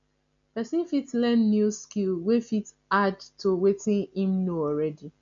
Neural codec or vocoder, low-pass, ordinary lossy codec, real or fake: none; 7.2 kHz; none; real